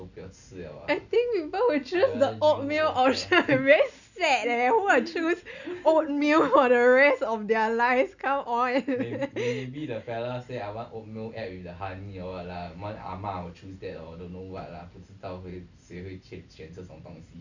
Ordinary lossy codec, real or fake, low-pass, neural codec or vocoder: none; real; 7.2 kHz; none